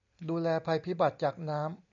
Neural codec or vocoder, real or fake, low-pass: none; real; 7.2 kHz